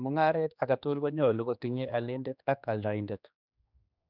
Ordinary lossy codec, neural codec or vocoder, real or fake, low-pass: none; codec, 16 kHz, 2 kbps, X-Codec, HuBERT features, trained on general audio; fake; 5.4 kHz